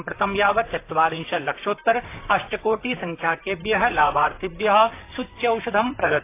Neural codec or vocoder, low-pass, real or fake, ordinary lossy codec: vocoder, 44.1 kHz, 128 mel bands, Pupu-Vocoder; 3.6 kHz; fake; AAC, 24 kbps